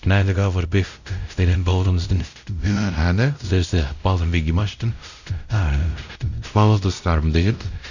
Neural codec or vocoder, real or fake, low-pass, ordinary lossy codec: codec, 16 kHz, 0.5 kbps, X-Codec, WavLM features, trained on Multilingual LibriSpeech; fake; 7.2 kHz; none